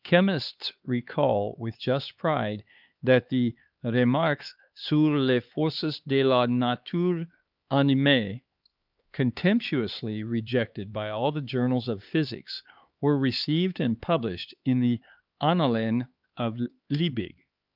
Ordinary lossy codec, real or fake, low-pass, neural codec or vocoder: Opus, 24 kbps; fake; 5.4 kHz; codec, 16 kHz, 4 kbps, X-Codec, HuBERT features, trained on LibriSpeech